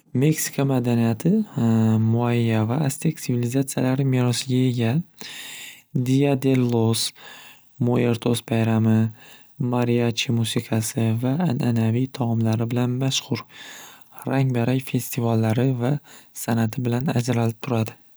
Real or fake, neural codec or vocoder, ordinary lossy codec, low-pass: real; none; none; none